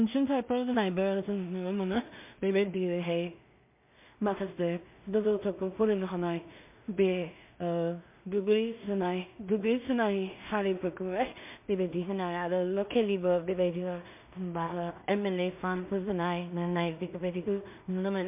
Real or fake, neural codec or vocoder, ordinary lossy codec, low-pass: fake; codec, 16 kHz in and 24 kHz out, 0.4 kbps, LongCat-Audio-Codec, two codebook decoder; MP3, 32 kbps; 3.6 kHz